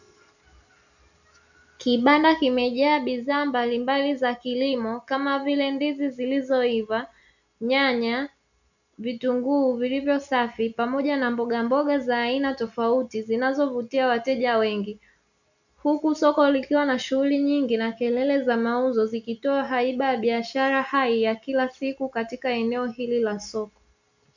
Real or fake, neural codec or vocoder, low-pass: real; none; 7.2 kHz